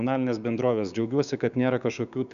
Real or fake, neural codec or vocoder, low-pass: fake; codec, 16 kHz, 6 kbps, DAC; 7.2 kHz